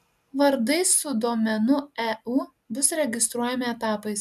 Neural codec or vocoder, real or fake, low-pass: none; real; 14.4 kHz